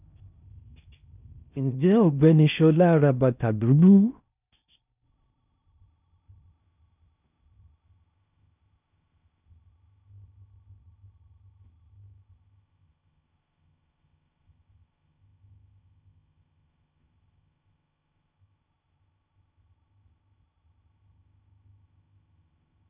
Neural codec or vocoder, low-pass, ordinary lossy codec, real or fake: codec, 16 kHz in and 24 kHz out, 0.6 kbps, FocalCodec, streaming, 4096 codes; 3.6 kHz; none; fake